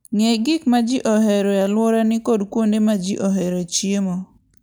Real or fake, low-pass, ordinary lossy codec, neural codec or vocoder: real; none; none; none